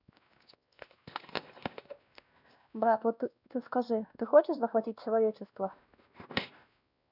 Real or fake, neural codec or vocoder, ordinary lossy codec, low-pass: fake; codec, 16 kHz, 1 kbps, X-Codec, WavLM features, trained on Multilingual LibriSpeech; none; 5.4 kHz